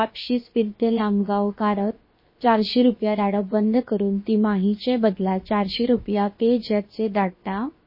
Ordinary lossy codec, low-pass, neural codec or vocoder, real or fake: MP3, 24 kbps; 5.4 kHz; codec, 16 kHz, about 1 kbps, DyCAST, with the encoder's durations; fake